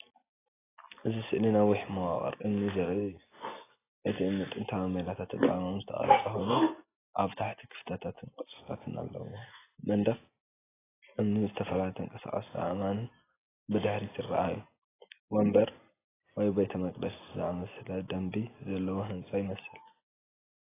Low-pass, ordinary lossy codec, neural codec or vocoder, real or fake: 3.6 kHz; AAC, 16 kbps; none; real